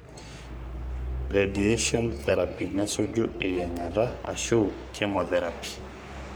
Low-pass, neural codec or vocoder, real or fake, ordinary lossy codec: none; codec, 44.1 kHz, 3.4 kbps, Pupu-Codec; fake; none